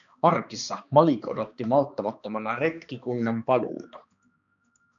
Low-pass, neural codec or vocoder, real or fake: 7.2 kHz; codec, 16 kHz, 2 kbps, X-Codec, HuBERT features, trained on balanced general audio; fake